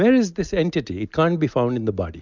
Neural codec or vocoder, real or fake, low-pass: none; real; 7.2 kHz